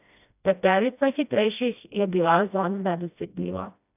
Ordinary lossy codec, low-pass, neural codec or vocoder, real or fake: none; 3.6 kHz; codec, 16 kHz, 1 kbps, FreqCodec, smaller model; fake